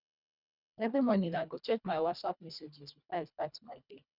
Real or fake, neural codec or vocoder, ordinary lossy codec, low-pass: fake; codec, 24 kHz, 1.5 kbps, HILCodec; none; 5.4 kHz